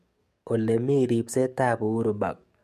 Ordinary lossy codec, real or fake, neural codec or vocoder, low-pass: MP3, 64 kbps; fake; codec, 44.1 kHz, 7.8 kbps, DAC; 14.4 kHz